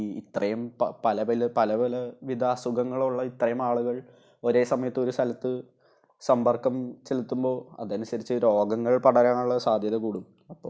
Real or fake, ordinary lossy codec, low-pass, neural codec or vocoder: real; none; none; none